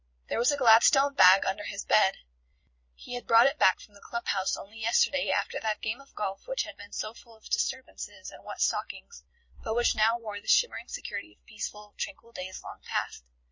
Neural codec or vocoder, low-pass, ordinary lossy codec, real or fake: vocoder, 44.1 kHz, 128 mel bands every 256 samples, BigVGAN v2; 7.2 kHz; MP3, 32 kbps; fake